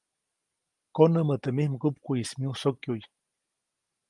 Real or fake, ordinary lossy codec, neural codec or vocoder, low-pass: real; Opus, 32 kbps; none; 10.8 kHz